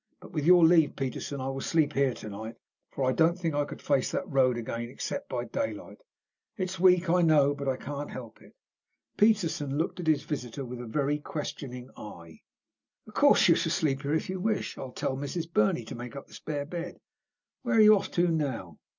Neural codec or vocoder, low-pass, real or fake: none; 7.2 kHz; real